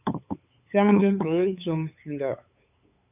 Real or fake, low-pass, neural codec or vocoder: fake; 3.6 kHz; codec, 16 kHz, 8 kbps, FunCodec, trained on LibriTTS, 25 frames a second